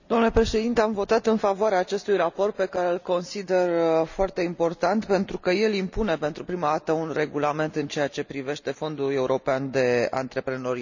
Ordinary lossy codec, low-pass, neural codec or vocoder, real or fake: none; 7.2 kHz; none; real